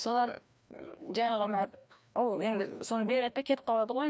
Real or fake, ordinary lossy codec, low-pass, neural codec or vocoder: fake; none; none; codec, 16 kHz, 1 kbps, FreqCodec, larger model